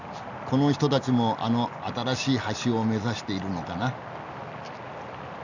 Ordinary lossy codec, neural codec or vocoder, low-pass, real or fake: none; none; 7.2 kHz; real